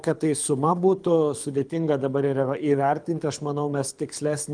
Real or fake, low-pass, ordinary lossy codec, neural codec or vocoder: fake; 9.9 kHz; Opus, 32 kbps; codec, 24 kHz, 6 kbps, HILCodec